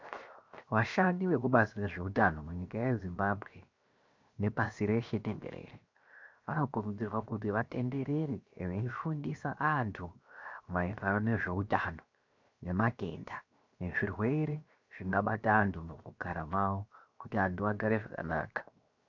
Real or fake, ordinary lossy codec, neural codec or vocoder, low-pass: fake; MP3, 64 kbps; codec, 16 kHz, 0.7 kbps, FocalCodec; 7.2 kHz